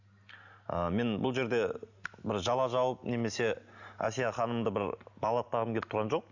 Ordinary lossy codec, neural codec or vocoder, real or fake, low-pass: none; none; real; 7.2 kHz